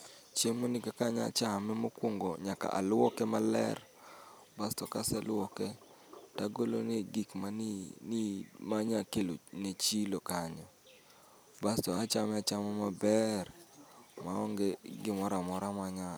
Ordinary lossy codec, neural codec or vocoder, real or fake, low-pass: none; none; real; none